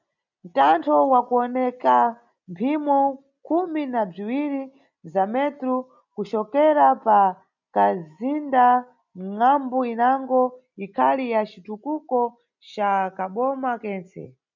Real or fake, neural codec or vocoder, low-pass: real; none; 7.2 kHz